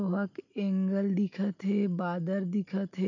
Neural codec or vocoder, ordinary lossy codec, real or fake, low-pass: none; none; real; 7.2 kHz